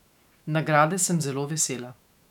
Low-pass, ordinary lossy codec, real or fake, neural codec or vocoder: 19.8 kHz; none; fake; autoencoder, 48 kHz, 128 numbers a frame, DAC-VAE, trained on Japanese speech